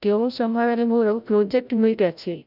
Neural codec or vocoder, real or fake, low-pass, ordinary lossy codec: codec, 16 kHz, 0.5 kbps, FreqCodec, larger model; fake; 5.4 kHz; none